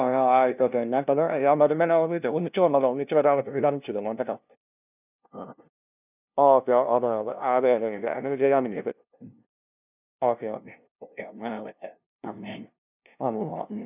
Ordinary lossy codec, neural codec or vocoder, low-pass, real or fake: none; codec, 16 kHz, 0.5 kbps, FunCodec, trained on LibriTTS, 25 frames a second; 3.6 kHz; fake